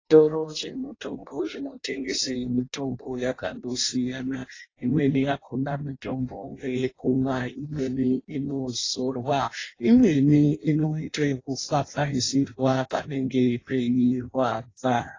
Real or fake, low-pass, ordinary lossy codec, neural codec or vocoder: fake; 7.2 kHz; AAC, 32 kbps; codec, 16 kHz in and 24 kHz out, 0.6 kbps, FireRedTTS-2 codec